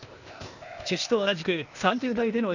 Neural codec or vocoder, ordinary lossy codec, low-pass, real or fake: codec, 16 kHz, 0.8 kbps, ZipCodec; none; 7.2 kHz; fake